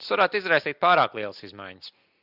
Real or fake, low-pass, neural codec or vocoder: real; 5.4 kHz; none